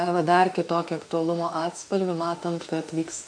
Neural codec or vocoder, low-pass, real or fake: autoencoder, 48 kHz, 32 numbers a frame, DAC-VAE, trained on Japanese speech; 9.9 kHz; fake